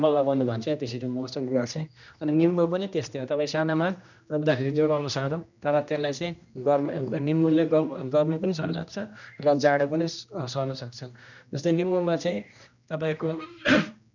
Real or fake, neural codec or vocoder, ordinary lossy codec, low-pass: fake; codec, 16 kHz, 1 kbps, X-Codec, HuBERT features, trained on general audio; none; 7.2 kHz